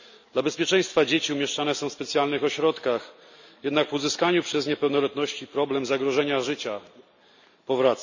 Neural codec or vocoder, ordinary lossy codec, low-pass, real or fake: none; none; 7.2 kHz; real